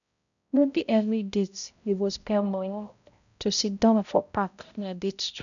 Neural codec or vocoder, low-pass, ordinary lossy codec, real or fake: codec, 16 kHz, 0.5 kbps, X-Codec, HuBERT features, trained on balanced general audio; 7.2 kHz; none; fake